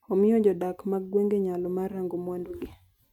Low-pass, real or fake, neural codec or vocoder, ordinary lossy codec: 19.8 kHz; real; none; none